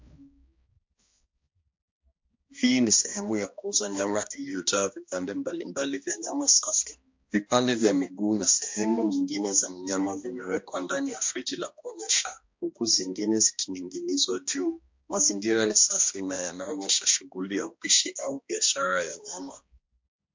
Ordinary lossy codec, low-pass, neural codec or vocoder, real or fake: MP3, 48 kbps; 7.2 kHz; codec, 16 kHz, 1 kbps, X-Codec, HuBERT features, trained on balanced general audio; fake